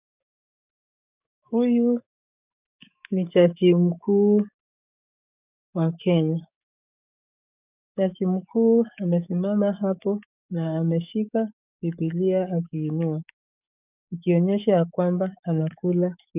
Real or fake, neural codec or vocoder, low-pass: fake; codec, 44.1 kHz, 7.8 kbps, DAC; 3.6 kHz